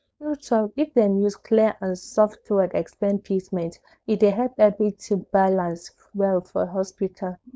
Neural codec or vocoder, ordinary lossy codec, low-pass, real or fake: codec, 16 kHz, 4.8 kbps, FACodec; none; none; fake